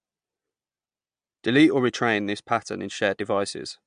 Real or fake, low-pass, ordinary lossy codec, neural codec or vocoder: real; 9.9 kHz; MP3, 64 kbps; none